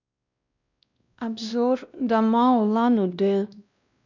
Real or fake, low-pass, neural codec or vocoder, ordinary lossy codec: fake; 7.2 kHz; codec, 16 kHz, 1 kbps, X-Codec, WavLM features, trained on Multilingual LibriSpeech; none